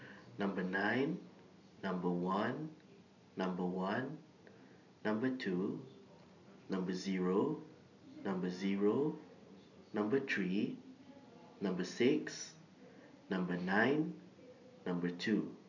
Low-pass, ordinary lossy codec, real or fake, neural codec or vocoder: 7.2 kHz; none; real; none